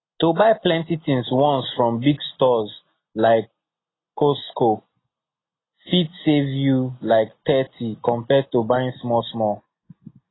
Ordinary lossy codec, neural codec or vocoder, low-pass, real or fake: AAC, 16 kbps; none; 7.2 kHz; real